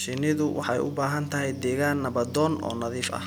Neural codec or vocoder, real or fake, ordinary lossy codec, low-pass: none; real; none; none